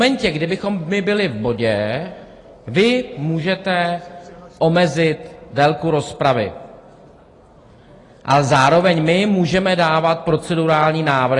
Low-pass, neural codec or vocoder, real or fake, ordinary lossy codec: 10.8 kHz; none; real; AAC, 32 kbps